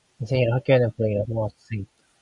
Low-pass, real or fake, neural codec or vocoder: 10.8 kHz; real; none